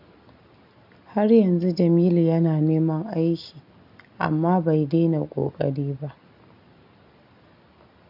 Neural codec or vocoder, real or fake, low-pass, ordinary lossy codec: none; real; 5.4 kHz; none